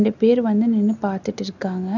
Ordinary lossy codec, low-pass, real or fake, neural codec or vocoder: none; 7.2 kHz; real; none